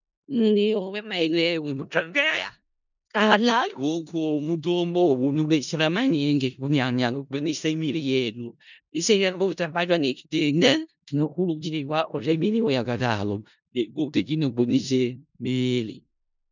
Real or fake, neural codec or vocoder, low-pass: fake; codec, 16 kHz in and 24 kHz out, 0.4 kbps, LongCat-Audio-Codec, four codebook decoder; 7.2 kHz